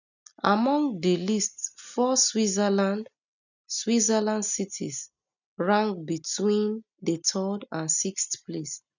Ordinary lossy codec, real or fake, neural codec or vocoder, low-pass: none; real; none; 7.2 kHz